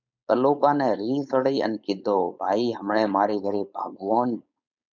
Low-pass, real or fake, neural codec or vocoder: 7.2 kHz; fake; codec, 16 kHz, 4.8 kbps, FACodec